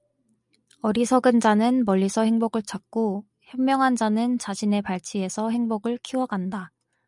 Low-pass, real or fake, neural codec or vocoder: 10.8 kHz; real; none